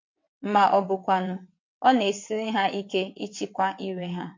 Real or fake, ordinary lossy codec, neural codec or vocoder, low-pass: fake; MP3, 48 kbps; vocoder, 22.05 kHz, 80 mel bands, WaveNeXt; 7.2 kHz